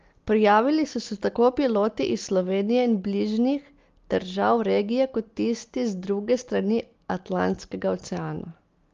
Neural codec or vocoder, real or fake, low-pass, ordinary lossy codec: none; real; 7.2 kHz; Opus, 32 kbps